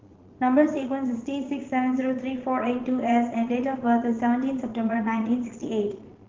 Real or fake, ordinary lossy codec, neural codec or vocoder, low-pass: fake; Opus, 16 kbps; vocoder, 22.05 kHz, 80 mel bands, Vocos; 7.2 kHz